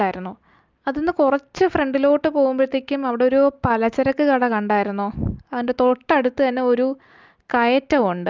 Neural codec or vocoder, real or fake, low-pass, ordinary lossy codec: none; real; 7.2 kHz; Opus, 24 kbps